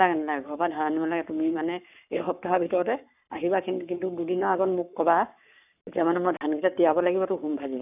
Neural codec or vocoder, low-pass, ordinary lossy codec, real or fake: codec, 44.1 kHz, 7.8 kbps, Pupu-Codec; 3.6 kHz; none; fake